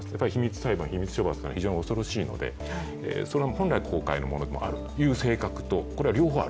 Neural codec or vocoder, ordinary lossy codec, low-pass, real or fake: none; none; none; real